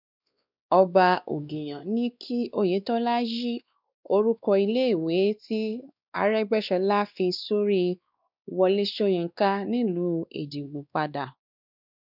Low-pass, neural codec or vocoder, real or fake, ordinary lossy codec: 5.4 kHz; codec, 16 kHz, 2 kbps, X-Codec, WavLM features, trained on Multilingual LibriSpeech; fake; none